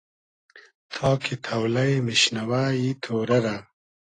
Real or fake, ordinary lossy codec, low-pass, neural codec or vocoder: real; AAC, 32 kbps; 9.9 kHz; none